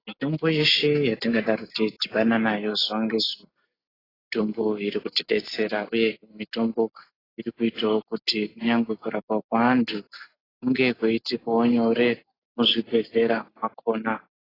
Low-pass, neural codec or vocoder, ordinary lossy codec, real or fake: 5.4 kHz; none; AAC, 24 kbps; real